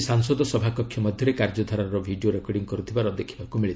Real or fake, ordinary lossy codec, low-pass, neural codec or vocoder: real; none; none; none